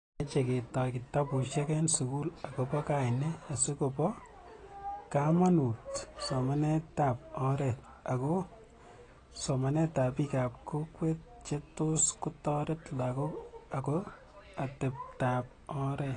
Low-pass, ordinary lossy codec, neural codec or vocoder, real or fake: 9.9 kHz; AAC, 32 kbps; none; real